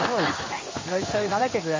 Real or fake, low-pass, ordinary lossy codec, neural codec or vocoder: fake; 7.2 kHz; MP3, 32 kbps; codec, 16 kHz in and 24 kHz out, 1 kbps, XY-Tokenizer